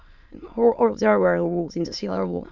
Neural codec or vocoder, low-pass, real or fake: autoencoder, 22.05 kHz, a latent of 192 numbers a frame, VITS, trained on many speakers; 7.2 kHz; fake